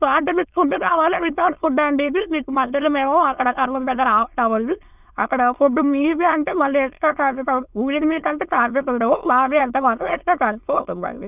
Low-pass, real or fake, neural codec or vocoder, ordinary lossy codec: 3.6 kHz; fake; autoencoder, 22.05 kHz, a latent of 192 numbers a frame, VITS, trained on many speakers; none